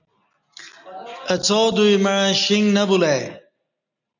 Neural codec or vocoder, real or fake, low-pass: none; real; 7.2 kHz